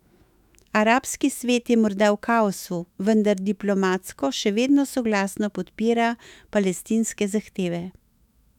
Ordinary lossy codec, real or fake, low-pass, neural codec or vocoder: none; fake; 19.8 kHz; autoencoder, 48 kHz, 128 numbers a frame, DAC-VAE, trained on Japanese speech